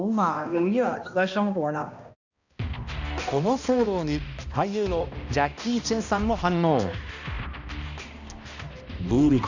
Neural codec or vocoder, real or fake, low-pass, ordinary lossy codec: codec, 16 kHz, 1 kbps, X-Codec, HuBERT features, trained on balanced general audio; fake; 7.2 kHz; none